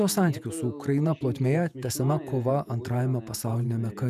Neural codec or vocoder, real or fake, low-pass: vocoder, 48 kHz, 128 mel bands, Vocos; fake; 14.4 kHz